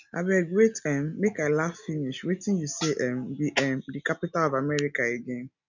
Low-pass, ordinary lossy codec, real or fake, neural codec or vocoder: 7.2 kHz; none; real; none